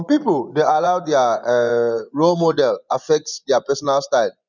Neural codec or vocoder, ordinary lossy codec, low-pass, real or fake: vocoder, 24 kHz, 100 mel bands, Vocos; none; 7.2 kHz; fake